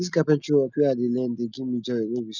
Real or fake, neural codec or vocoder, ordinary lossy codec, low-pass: real; none; none; 7.2 kHz